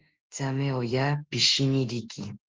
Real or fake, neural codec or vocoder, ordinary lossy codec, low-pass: fake; autoencoder, 48 kHz, 32 numbers a frame, DAC-VAE, trained on Japanese speech; Opus, 16 kbps; 7.2 kHz